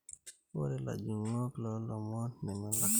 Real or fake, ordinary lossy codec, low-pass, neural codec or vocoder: real; none; none; none